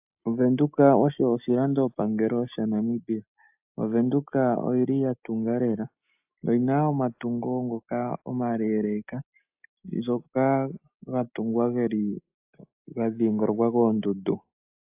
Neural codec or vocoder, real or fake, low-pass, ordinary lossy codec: none; real; 3.6 kHz; AAC, 32 kbps